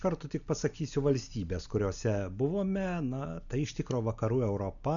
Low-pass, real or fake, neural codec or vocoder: 7.2 kHz; real; none